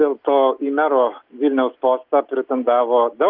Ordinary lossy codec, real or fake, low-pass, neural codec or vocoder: Opus, 32 kbps; real; 5.4 kHz; none